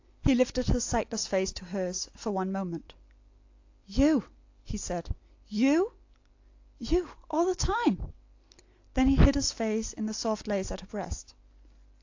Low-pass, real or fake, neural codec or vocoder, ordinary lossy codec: 7.2 kHz; real; none; AAC, 48 kbps